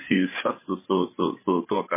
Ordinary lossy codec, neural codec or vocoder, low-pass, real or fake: MP3, 16 kbps; codec, 16 kHz, 8 kbps, FreqCodec, larger model; 3.6 kHz; fake